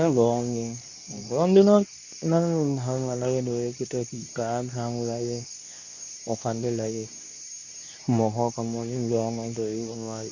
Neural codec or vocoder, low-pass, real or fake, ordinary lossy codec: codec, 24 kHz, 0.9 kbps, WavTokenizer, medium speech release version 2; 7.2 kHz; fake; none